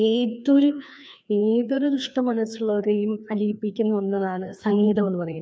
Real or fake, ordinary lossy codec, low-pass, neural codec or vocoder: fake; none; none; codec, 16 kHz, 2 kbps, FreqCodec, larger model